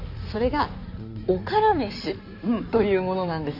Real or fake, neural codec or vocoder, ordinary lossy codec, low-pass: fake; codec, 16 kHz in and 24 kHz out, 2.2 kbps, FireRedTTS-2 codec; none; 5.4 kHz